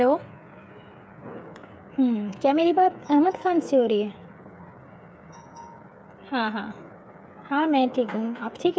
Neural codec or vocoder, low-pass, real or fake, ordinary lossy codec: codec, 16 kHz, 8 kbps, FreqCodec, smaller model; none; fake; none